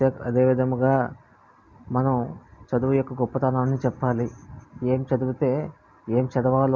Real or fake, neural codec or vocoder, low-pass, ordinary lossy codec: real; none; none; none